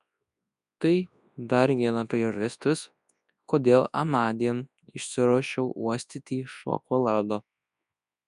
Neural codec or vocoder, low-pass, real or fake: codec, 24 kHz, 0.9 kbps, WavTokenizer, large speech release; 10.8 kHz; fake